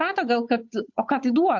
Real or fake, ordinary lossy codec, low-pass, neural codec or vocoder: fake; MP3, 64 kbps; 7.2 kHz; codec, 16 kHz, 8 kbps, FunCodec, trained on Chinese and English, 25 frames a second